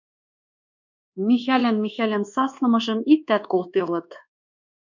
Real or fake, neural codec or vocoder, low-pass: fake; codec, 24 kHz, 1.2 kbps, DualCodec; 7.2 kHz